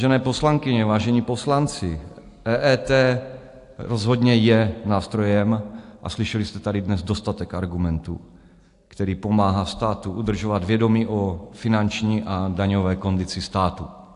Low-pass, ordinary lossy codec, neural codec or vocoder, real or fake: 10.8 kHz; AAC, 64 kbps; none; real